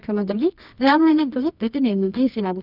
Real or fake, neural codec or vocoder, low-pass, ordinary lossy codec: fake; codec, 24 kHz, 0.9 kbps, WavTokenizer, medium music audio release; 5.4 kHz; none